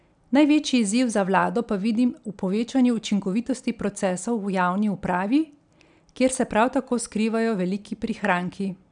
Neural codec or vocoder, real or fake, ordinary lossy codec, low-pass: none; real; none; 9.9 kHz